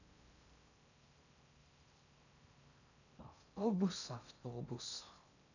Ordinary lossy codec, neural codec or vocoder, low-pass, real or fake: none; codec, 16 kHz in and 24 kHz out, 0.6 kbps, FocalCodec, streaming, 4096 codes; 7.2 kHz; fake